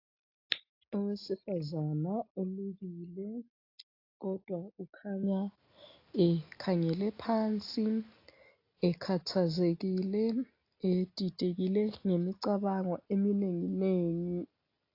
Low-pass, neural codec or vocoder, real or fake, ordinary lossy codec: 5.4 kHz; none; real; AAC, 32 kbps